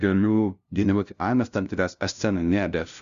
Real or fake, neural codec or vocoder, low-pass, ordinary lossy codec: fake; codec, 16 kHz, 0.5 kbps, FunCodec, trained on LibriTTS, 25 frames a second; 7.2 kHz; Opus, 64 kbps